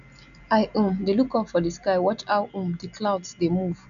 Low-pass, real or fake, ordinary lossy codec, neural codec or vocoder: 7.2 kHz; real; none; none